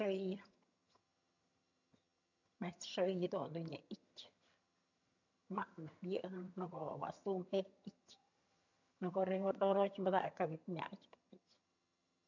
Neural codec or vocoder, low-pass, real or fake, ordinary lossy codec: vocoder, 22.05 kHz, 80 mel bands, HiFi-GAN; 7.2 kHz; fake; none